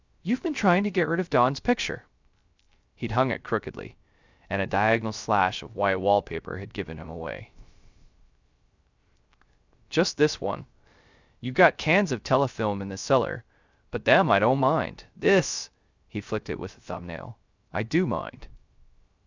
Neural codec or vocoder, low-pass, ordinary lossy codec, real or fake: codec, 16 kHz, 0.3 kbps, FocalCodec; 7.2 kHz; Opus, 64 kbps; fake